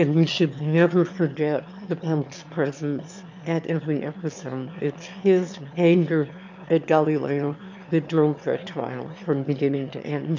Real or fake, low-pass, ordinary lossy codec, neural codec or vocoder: fake; 7.2 kHz; MP3, 64 kbps; autoencoder, 22.05 kHz, a latent of 192 numbers a frame, VITS, trained on one speaker